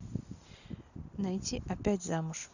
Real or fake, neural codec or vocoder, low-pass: real; none; 7.2 kHz